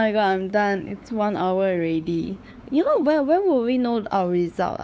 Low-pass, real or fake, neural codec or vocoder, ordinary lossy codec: none; fake; codec, 16 kHz, 4 kbps, X-Codec, WavLM features, trained on Multilingual LibriSpeech; none